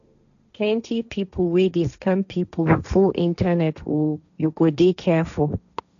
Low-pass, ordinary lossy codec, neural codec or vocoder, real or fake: 7.2 kHz; none; codec, 16 kHz, 1.1 kbps, Voila-Tokenizer; fake